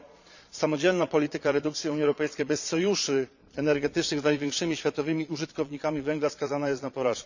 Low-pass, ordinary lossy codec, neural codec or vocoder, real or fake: 7.2 kHz; none; vocoder, 22.05 kHz, 80 mel bands, Vocos; fake